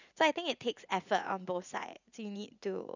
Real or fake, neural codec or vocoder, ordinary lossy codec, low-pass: fake; vocoder, 44.1 kHz, 80 mel bands, Vocos; none; 7.2 kHz